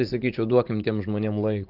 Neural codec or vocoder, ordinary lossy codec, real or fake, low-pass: vocoder, 44.1 kHz, 80 mel bands, Vocos; Opus, 32 kbps; fake; 5.4 kHz